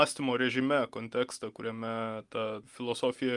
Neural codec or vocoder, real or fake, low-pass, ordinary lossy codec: none; real; 10.8 kHz; Opus, 32 kbps